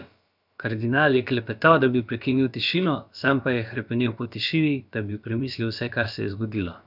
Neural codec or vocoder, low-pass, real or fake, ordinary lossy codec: codec, 16 kHz, about 1 kbps, DyCAST, with the encoder's durations; 5.4 kHz; fake; none